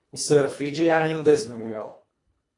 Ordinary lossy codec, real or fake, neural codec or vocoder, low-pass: AAC, 48 kbps; fake; codec, 24 kHz, 1.5 kbps, HILCodec; 10.8 kHz